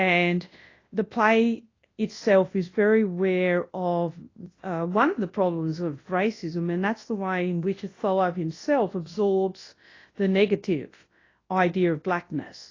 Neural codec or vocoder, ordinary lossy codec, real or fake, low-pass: codec, 24 kHz, 0.9 kbps, WavTokenizer, large speech release; AAC, 32 kbps; fake; 7.2 kHz